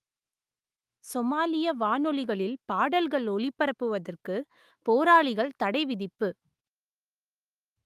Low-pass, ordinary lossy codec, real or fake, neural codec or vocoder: 14.4 kHz; Opus, 32 kbps; fake; autoencoder, 48 kHz, 128 numbers a frame, DAC-VAE, trained on Japanese speech